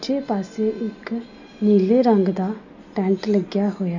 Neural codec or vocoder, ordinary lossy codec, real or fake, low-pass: none; none; real; 7.2 kHz